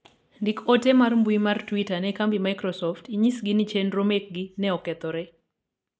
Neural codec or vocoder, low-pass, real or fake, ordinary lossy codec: none; none; real; none